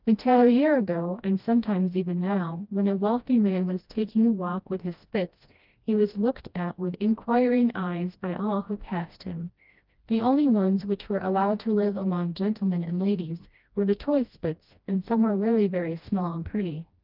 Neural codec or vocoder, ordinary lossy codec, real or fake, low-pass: codec, 16 kHz, 1 kbps, FreqCodec, smaller model; Opus, 24 kbps; fake; 5.4 kHz